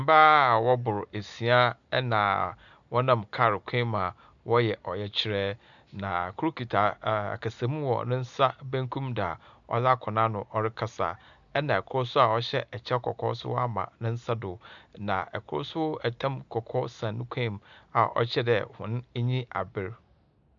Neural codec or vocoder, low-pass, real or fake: none; 7.2 kHz; real